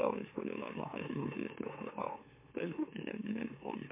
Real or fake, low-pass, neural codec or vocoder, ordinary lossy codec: fake; 3.6 kHz; autoencoder, 44.1 kHz, a latent of 192 numbers a frame, MeloTTS; MP3, 24 kbps